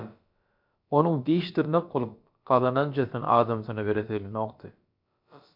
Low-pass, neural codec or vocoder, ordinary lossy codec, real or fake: 5.4 kHz; codec, 16 kHz, about 1 kbps, DyCAST, with the encoder's durations; MP3, 48 kbps; fake